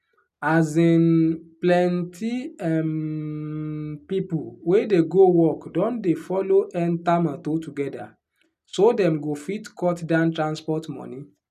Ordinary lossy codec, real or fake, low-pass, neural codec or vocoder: none; real; 14.4 kHz; none